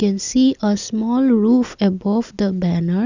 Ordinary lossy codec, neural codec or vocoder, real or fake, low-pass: none; none; real; 7.2 kHz